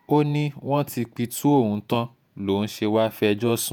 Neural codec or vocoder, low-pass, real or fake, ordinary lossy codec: vocoder, 48 kHz, 128 mel bands, Vocos; none; fake; none